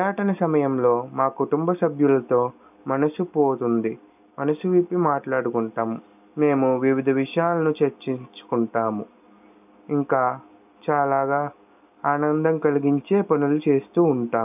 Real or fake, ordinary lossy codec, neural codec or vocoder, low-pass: real; none; none; 3.6 kHz